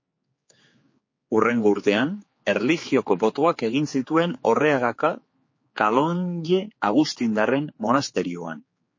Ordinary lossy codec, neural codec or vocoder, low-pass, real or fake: MP3, 32 kbps; codec, 16 kHz, 4 kbps, X-Codec, HuBERT features, trained on general audio; 7.2 kHz; fake